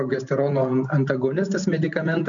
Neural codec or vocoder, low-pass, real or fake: none; 7.2 kHz; real